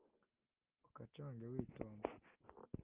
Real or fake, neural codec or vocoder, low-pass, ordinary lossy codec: real; none; 3.6 kHz; Opus, 24 kbps